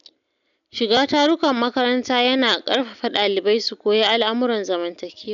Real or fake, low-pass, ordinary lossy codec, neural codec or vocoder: real; 7.2 kHz; none; none